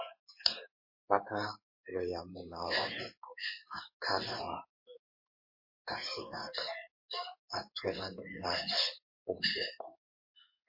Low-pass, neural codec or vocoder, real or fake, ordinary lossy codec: 5.4 kHz; codec, 16 kHz in and 24 kHz out, 1 kbps, XY-Tokenizer; fake; MP3, 32 kbps